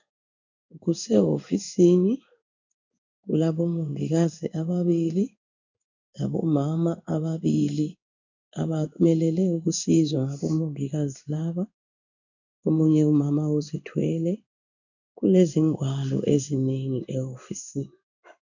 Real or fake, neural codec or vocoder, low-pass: fake; codec, 16 kHz in and 24 kHz out, 1 kbps, XY-Tokenizer; 7.2 kHz